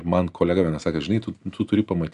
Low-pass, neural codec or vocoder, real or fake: 10.8 kHz; none; real